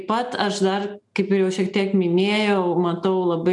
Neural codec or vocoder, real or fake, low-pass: none; real; 10.8 kHz